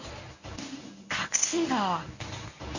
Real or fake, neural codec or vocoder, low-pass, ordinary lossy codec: fake; codec, 24 kHz, 0.9 kbps, WavTokenizer, medium speech release version 1; 7.2 kHz; none